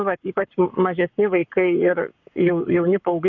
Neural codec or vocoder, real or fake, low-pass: codec, 44.1 kHz, 7.8 kbps, Pupu-Codec; fake; 7.2 kHz